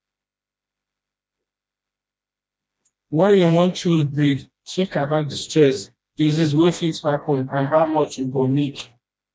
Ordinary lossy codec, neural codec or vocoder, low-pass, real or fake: none; codec, 16 kHz, 1 kbps, FreqCodec, smaller model; none; fake